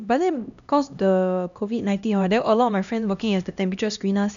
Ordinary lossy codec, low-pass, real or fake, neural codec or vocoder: none; 7.2 kHz; fake; codec, 16 kHz, 1 kbps, X-Codec, HuBERT features, trained on LibriSpeech